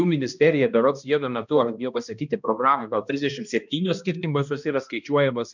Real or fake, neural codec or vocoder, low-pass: fake; codec, 16 kHz, 1 kbps, X-Codec, HuBERT features, trained on balanced general audio; 7.2 kHz